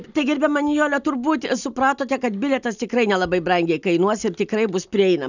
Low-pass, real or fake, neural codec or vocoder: 7.2 kHz; real; none